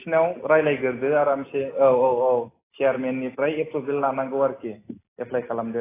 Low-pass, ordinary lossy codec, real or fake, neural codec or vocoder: 3.6 kHz; AAC, 16 kbps; real; none